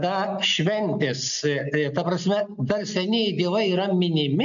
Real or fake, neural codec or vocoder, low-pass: real; none; 7.2 kHz